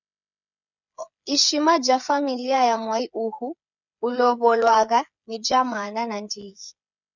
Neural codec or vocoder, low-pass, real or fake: codec, 16 kHz, 8 kbps, FreqCodec, smaller model; 7.2 kHz; fake